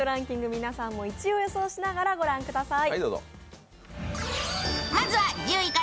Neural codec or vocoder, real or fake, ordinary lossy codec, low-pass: none; real; none; none